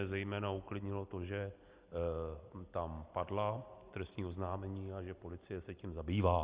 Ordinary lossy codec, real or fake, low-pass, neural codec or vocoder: Opus, 32 kbps; real; 3.6 kHz; none